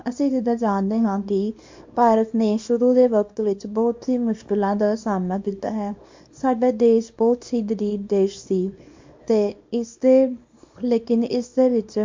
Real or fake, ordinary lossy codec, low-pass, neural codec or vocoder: fake; MP3, 48 kbps; 7.2 kHz; codec, 24 kHz, 0.9 kbps, WavTokenizer, small release